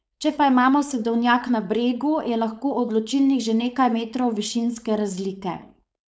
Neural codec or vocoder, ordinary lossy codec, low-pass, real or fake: codec, 16 kHz, 4.8 kbps, FACodec; none; none; fake